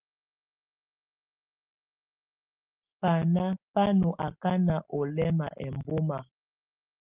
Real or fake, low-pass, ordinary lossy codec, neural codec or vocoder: real; 3.6 kHz; Opus, 24 kbps; none